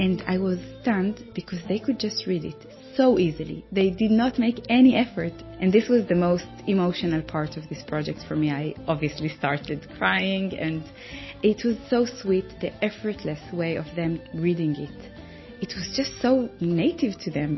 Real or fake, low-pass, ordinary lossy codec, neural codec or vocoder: real; 7.2 kHz; MP3, 24 kbps; none